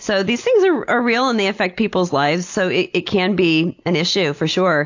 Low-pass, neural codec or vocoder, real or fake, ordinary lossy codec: 7.2 kHz; none; real; AAC, 48 kbps